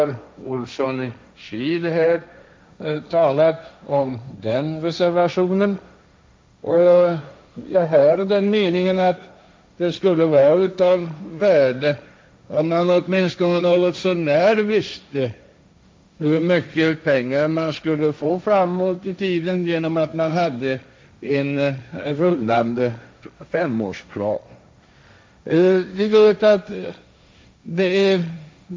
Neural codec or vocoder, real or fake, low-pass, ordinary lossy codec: codec, 16 kHz, 1.1 kbps, Voila-Tokenizer; fake; none; none